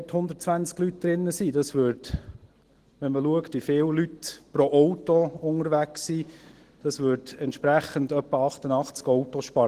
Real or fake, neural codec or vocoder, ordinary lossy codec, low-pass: real; none; Opus, 16 kbps; 14.4 kHz